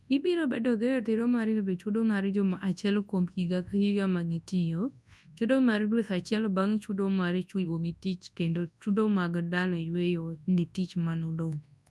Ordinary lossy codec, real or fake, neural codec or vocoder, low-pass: none; fake; codec, 24 kHz, 0.9 kbps, WavTokenizer, large speech release; none